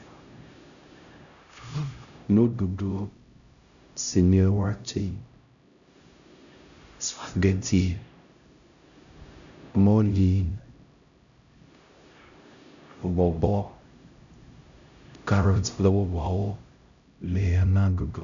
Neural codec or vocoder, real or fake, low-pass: codec, 16 kHz, 0.5 kbps, X-Codec, HuBERT features, trained on LibriSpeech; fake; 7.2 kHz